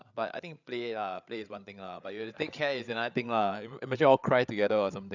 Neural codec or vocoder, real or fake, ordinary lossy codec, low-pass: codec, 16 kHz, 8 kbps, FreqCodec, larger model; fake; none; 7.2 kHz